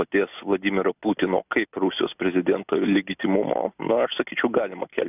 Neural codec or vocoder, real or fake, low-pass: none; real; 3.6 kHz